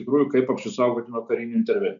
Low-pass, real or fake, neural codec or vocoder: 7.2 kHz; real; none